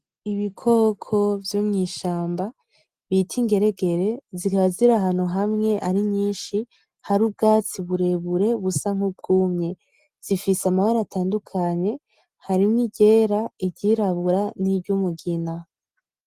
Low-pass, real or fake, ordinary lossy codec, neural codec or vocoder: 14.4 kHz; real; Opus, 24 kbps; none